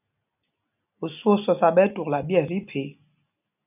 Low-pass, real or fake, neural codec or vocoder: 3.6 kHz; real; none